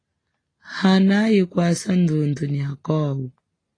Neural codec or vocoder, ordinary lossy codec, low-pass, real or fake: none; AAC, 32 kbps; 9.9 kHz; real